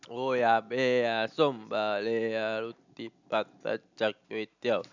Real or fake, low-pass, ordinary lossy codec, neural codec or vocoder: real; 7.2 kHz; none; none